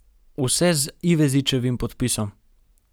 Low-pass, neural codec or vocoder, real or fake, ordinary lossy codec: none; none; real; none